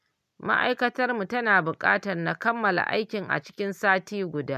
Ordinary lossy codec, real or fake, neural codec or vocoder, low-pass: none; real; none; 14.4 kHz